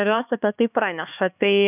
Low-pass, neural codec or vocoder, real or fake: 3.6 kHz; codec, 16 kHz, 4 kbps, X-Codec, HuBERT features, trained on LibriSpeech; fake